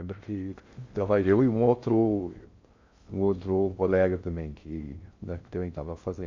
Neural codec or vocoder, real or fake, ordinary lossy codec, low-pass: codec, 16 kHz in and 24 kHz out, 0.6 kbps, FocalCodec, streaming, 2048 codes; fake; MP3, 64 kbps; 7.2 kHz